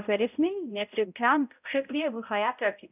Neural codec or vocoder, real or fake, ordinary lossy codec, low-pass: codec, 16 kHz, 0.5 kbps, X-Codec, HuBERT features, trained on balanced general audio; fake; none; 3.6 kHz